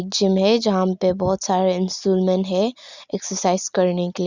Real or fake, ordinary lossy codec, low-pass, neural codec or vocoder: real; Opus, 64 kbps; 7.2 kHz; none